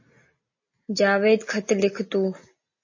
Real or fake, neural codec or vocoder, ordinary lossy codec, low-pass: real; none; MP3, 32 kbps; 7.2 kHz